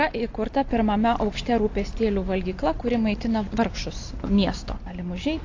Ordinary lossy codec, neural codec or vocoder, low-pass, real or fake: AAC, 48 kbps; none; 7.2 kHz; real